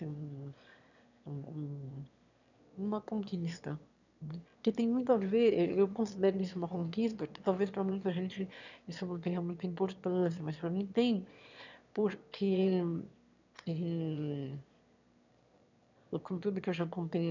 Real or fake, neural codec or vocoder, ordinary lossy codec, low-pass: fake; autoencoder, 22.05 kHz, a latent of 192 numbers a frame, VITS, trained on one speaker; none; 7.2 kHz